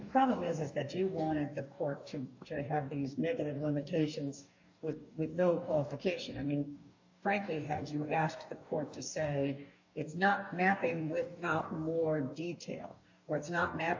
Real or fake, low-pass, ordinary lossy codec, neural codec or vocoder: fake; 7.2 kHz; AAC, 48 kbps; codec, 44.1 kHz, 2.6 kbps, DAC